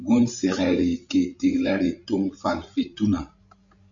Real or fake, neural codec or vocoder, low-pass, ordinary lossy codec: fake; codec, 16 kHz, 16 kbps, FreqCodec, larger model; 7.2 kHz; MP3, 64 kbps